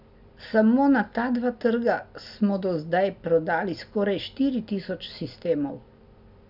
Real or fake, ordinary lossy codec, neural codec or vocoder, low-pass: real; none; none; 5.4 kHz